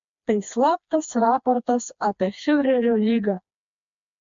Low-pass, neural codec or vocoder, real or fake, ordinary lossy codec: 7.2 kHz; codec, 16 kHz, 2 kbps, FreqCodec, smaller model; fake; MP3, 64 kbps